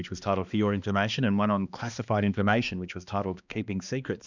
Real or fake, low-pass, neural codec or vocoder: fake; 7.2 kHz; codec, 16 kHz, 2 kbps, X-Codec, HuBERT features, trained on balanced general audio